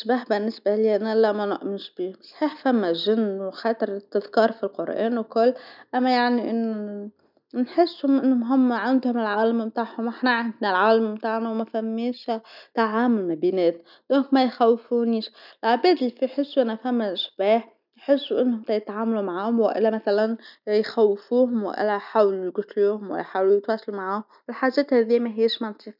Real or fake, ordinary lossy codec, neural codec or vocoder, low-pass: real; none; none; 5.4 kHz